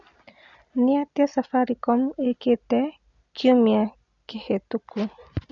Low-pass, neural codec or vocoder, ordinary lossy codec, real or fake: 7.2 kHz; none; none; real